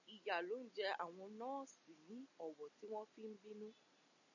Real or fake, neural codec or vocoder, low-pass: real; none; 7.2 kHz